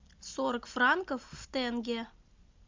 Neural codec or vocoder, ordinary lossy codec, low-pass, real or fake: none; MP3, 64 kbps; 7.2 kHz; real